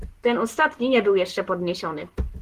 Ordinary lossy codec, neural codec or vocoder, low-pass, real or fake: Opus, 16 kbps; none; 14.4 kHz; real